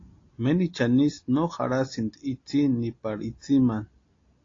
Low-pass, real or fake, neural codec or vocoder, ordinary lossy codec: 7.2 kHz; real; none; AAC, 32 kbps